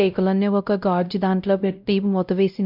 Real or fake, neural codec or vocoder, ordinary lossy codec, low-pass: fake; codec, 16 kHz, 0.5 kbps, X-Codec, WavLM features, trained on Multilingual LibriSpeech; none; 5.4 kHz